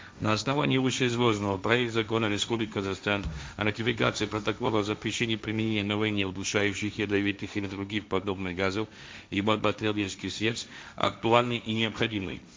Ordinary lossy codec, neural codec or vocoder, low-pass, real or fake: none; codec, 16 kHz, 1.1 kbps, Voila-Tokenizer; none; fake